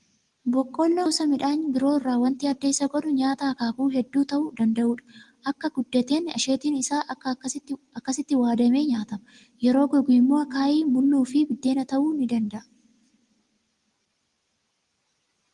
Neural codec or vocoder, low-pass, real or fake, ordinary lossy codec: none; 10.8 kHz; real; Opus, 24 kbps